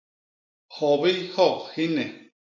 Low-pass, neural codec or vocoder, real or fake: 7.2 kHz; none; real